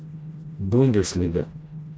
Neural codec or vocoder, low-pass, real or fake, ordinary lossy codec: codec, 16 kHz, 1 kbps, FreqCodec, smaller model; none; fake; none